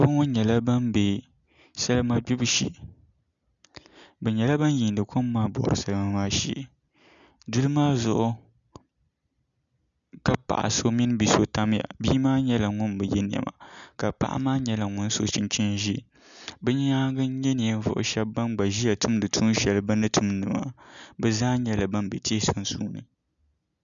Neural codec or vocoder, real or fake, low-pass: none; real; 7.2 kHz